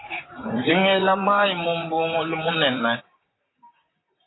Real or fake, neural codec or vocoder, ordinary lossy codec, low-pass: fake; vocoder, 44.1 kHz, 128 mel bands, Pupu-Vocoder; AAC, 16 kbps; 7.2 kHz